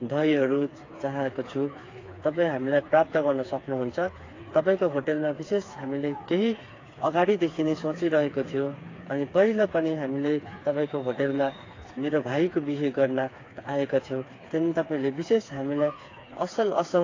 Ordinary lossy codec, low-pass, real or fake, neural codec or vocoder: AAC, 32 kbps; 7.2 kHz; fake; codec, 16 kHz, 4 kbps, FreqCodec, smaller model